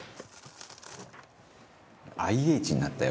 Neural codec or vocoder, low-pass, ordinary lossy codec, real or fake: none; none; none; real